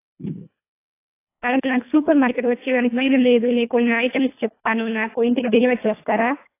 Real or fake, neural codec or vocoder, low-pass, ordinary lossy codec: fake; codec, 24 kHz, 1.5 kbps, HILCodec; 3.6 kHz; AAC, 24 kbps